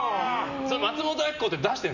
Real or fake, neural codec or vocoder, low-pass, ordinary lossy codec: real; none; 7.2 kHz; none